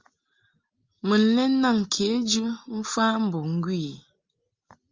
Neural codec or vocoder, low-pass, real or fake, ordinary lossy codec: none; 7.2 kHz; real; Opus, 32 kbps